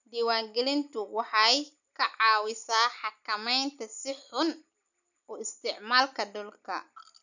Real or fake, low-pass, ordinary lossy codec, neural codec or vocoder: real; 7.2 kHz; none; none